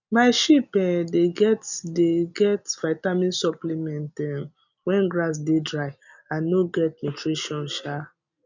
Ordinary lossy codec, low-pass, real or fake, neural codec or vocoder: none; 7.2 kHz; real; none